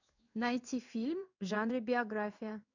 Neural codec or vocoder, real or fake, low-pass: codec, 16 kHz in and 24 kHz out, 1 kbps, XY-Tokenizer; fake; 7.2 kHz